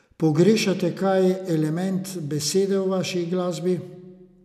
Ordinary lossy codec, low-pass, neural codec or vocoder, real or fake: none; 14.4 kHz; none; real